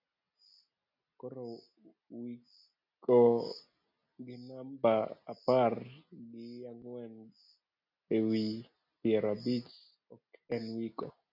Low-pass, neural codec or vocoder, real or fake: 5.4 kHz; none; real